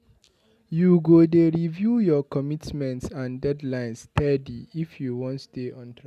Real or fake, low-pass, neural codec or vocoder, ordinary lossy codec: real; 14.4 kHz; none; none